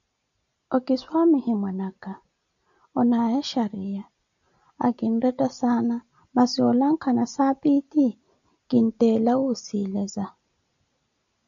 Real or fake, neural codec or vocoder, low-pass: real; none; 7.2 kHz